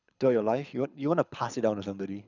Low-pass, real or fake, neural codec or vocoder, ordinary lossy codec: 7.2 kHz; fake; codec, 24 kHz, 6 kbps, HILCodec; none